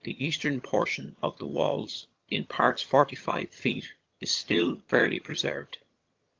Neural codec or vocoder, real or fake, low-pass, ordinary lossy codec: vocoder, 22.05 kHz, 80 mel bands, HiFi-GAN; fake; 7.2 kHz; Opus, 32 kbps